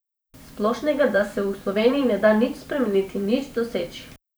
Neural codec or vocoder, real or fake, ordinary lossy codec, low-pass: vocoder, 44.1 kHz, 128 mel bands every 512 samples, BigVGAN v2; fake; none; none